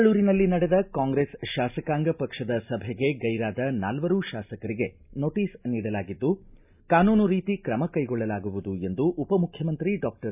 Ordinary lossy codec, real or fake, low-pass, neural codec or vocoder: none; real; 3.6 kHz; none